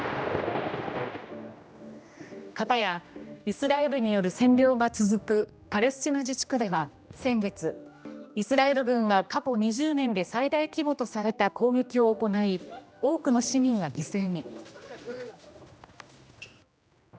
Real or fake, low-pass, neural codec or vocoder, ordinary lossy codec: fake; none; codec, 16 kHz, 1 kbps, X-Codec, HuBERT features, trained on general audio; none